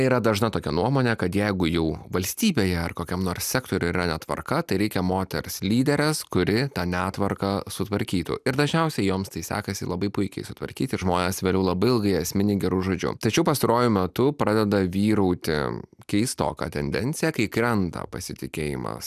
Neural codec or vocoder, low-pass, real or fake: none; 14.4 kHz; real